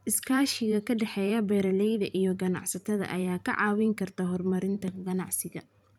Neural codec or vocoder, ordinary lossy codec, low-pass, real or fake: vocoder, 44.1 kHz, 128 mel bands every 512 samples, BigVGAN v2; none; 19.8 kHz; fake